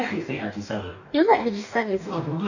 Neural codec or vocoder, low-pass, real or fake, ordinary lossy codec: codec, 44.1 kHz, 2.6 kbps, DAC; 7.2 kHz; fake; none